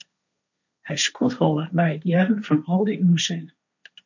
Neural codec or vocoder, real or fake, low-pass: codec, 16 kHz, 1.1 kbps, Voila-Tokenizer; fake; 7.2 kHz